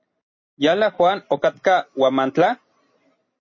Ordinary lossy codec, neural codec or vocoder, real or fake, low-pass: MP3, 32 kbps; none; real; 7.2 kHz